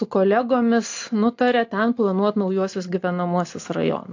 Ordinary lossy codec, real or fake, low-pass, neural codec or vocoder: AAC, 48 kbps; real; 7.2 kHz; none